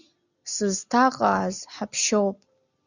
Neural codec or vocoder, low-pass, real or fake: none; 7.2 kHz; real